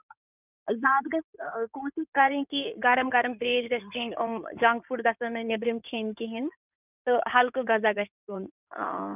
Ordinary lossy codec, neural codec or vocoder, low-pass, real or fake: none; codec, 16 kHz in and 24 kHz out, 2.2 kbps, FireRedTTS-2 codec; 3.6 kHz; fake